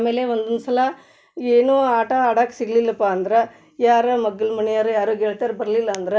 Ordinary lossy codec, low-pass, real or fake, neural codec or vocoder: none; none; real; none